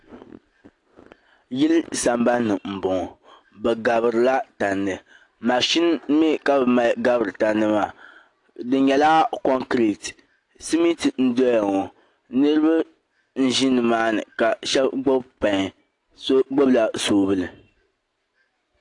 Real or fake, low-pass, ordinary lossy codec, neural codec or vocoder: real; 10.8 kHz; AAC, 48 kbps; none